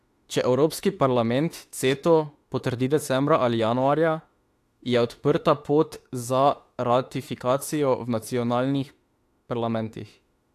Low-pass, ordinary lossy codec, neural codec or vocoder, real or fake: 14.4 kHz; AAC, 64 kbps; autoencoder, 48 kHz, 32 numbers a frame, DAC-VAE, trained on Japanese speech; fake